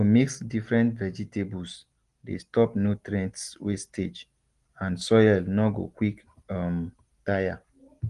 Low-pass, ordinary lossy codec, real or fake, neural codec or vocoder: 10.8 kHz; Opus, 24 kbps; real; none